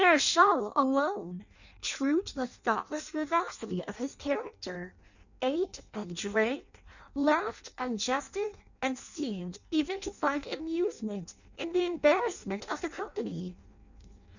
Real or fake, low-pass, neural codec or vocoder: fake; 7.2 kHz; codec, 16 kHz in and 24 kHz out, 0.6 kbps, FireRedTTS-2 codec